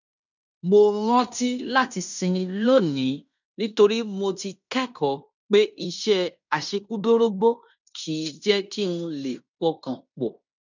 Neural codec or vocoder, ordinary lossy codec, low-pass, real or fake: codec, 16 kHz in and 24 kHz out, 0.9 kbps, LongCat-Audio-Codec, fine tuned four codebook decoder; none; 7.2 kHz; fake